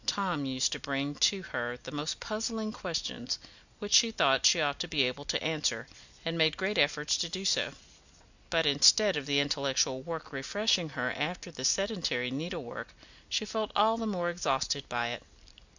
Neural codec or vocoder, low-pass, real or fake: none; 7.2 kHz; real